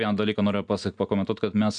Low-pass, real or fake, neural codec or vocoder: 9.9 kHz; real; none